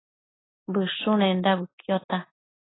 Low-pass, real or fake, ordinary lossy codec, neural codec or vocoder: 7.2 kHz; real; AAC, 16 kbps; none